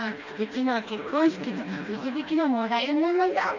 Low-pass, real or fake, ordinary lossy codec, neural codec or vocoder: 7.2 kHz; fake; AAC, 48 kbps; codec, 16 kHz, 1 kbps, FreqCodec, smaller model